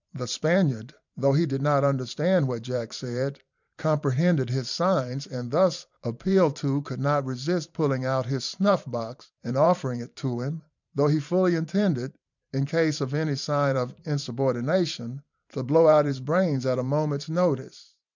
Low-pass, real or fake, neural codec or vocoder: 7.2 kHz; fake; vocoder, 44.1 kHz, 128 mel bands every 512 samples, BigVGAN v2